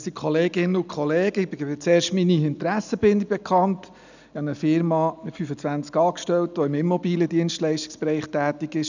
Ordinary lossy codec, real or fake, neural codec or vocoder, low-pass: none; real; none; 7.2 kHz